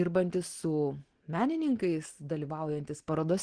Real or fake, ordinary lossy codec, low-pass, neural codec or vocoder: real; Opus, 16 kbps; 9.9 kHz; none